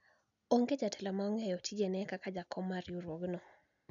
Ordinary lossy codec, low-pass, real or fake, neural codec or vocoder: none; 7.2 kHz; real; none